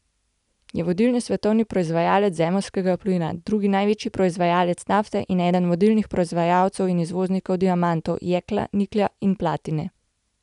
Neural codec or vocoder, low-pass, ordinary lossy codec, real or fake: none; 10.8 kHz; none; real